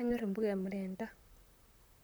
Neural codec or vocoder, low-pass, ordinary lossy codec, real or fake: vocoder, 44.1 kHz, 128 mel bands, Pupu-Vocoder; none; none; fake